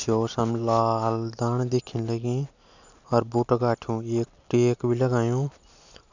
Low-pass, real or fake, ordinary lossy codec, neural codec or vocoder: 7.2 kHz; real; none; none